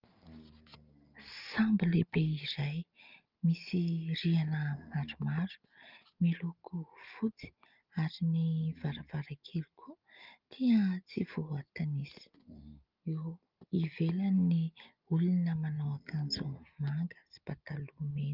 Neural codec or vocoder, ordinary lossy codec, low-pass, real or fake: none; Opus, 24 kbps; 5.4 kHz; real